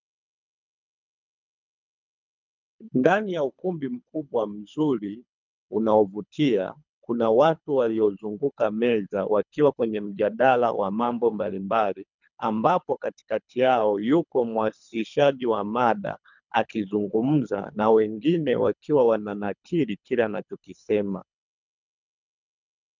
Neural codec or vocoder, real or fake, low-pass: codec, 24 kHz, 3 kbps, HILCodec; fake; 7.2 kHz